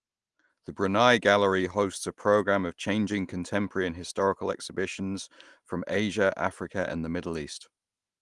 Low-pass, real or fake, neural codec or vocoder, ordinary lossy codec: 10.8 kHz; real; none; Opus, 24 kbps